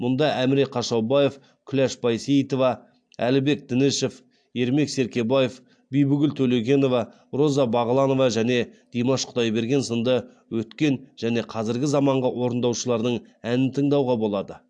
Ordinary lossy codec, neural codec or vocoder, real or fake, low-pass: AAC, 64 kbps; none; real; 9.9 kHz